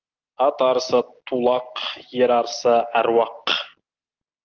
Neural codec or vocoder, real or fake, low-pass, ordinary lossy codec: none; real; 7.2 kHz; Opus, 32 kbps